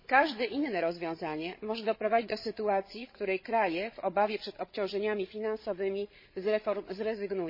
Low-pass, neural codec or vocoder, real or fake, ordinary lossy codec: 5.4 kHz; codec, 16 kHz, 16 kbps, FreqCodec, larger model; fake; MP3, 24 kbps